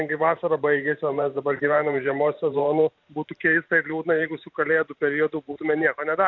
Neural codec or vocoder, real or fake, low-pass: vocoder, 44.1 kHz, 128 mel bands every 512 samples, BigVGAN v2; fake; 7.2 kHz